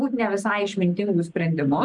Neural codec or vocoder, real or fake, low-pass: none; real; 10.8 kHz